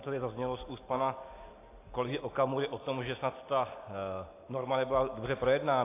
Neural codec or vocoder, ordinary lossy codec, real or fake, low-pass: none; AAC, 24 kbps; real; 3.6 kHz